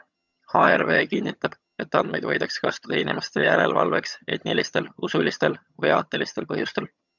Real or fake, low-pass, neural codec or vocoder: fake; 7.2 kHz; vocoder, 22.05 kHz, 80 mel bands, HiFi-GAN